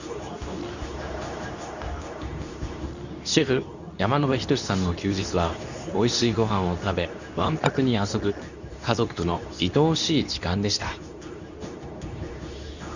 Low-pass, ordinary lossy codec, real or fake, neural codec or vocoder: 7.2 kHz; none; fake; codec, 24 kHz, 0.9 kbps, WavTokenizer, medium speech release version 2